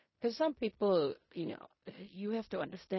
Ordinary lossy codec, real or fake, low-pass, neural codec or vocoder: MP3, 24 kbps; fake; 7.2 kHz; codec, 16 kHz in and 24 kHz out, 0.4 kbps, LongCat-Audio-Codec, fine tuned four codebook decoder